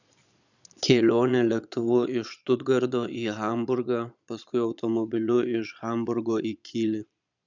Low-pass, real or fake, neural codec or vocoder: 7.2 kHz; fake; vocoder, 22.05 kHz, 80 mel bands, Vocos